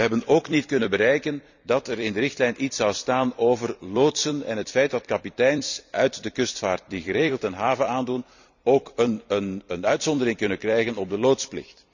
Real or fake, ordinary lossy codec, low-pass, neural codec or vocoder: fake; none; 7.2 kHz; vocoder, 44.1 kHz, 128 mel bands every 256 samples, BigVGAN v2